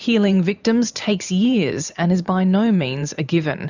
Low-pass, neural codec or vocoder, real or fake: 7.2 kHz; vocoder, 44.1 kHz, 128 mel bands every 512 samples, BigVGAN v2; fake